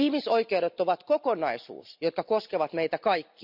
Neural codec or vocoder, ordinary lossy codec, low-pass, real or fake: none; none; 5.4 kHz; real